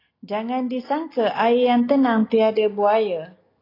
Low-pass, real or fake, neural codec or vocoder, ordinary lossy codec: 5.4 kHz; real; none; AAC, 24 kbps